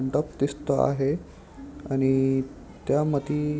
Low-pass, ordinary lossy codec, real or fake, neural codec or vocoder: none; none; real; none